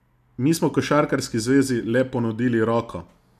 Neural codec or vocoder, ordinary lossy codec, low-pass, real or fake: none; none; 14.4 kHz; real